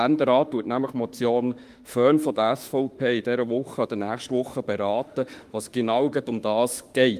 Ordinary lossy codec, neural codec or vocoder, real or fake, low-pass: Opus, 32 kbps; autoencoder, 48 kHz, 32 numbers a frame, DAC-VAE, trained on Japanese speech; fake; 14.4 kHz